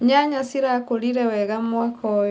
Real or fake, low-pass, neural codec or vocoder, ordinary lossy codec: real; none; none; none